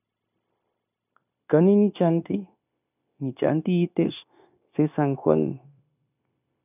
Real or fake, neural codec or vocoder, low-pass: fake; codec, 16 kHz, 0.9 kbps, LongCat-Audio-Codec; 3.6 kHz